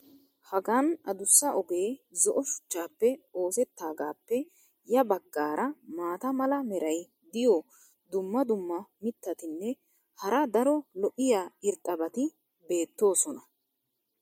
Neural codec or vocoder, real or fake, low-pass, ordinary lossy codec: none; real; 19.8 kHz; MP3, 64 kbps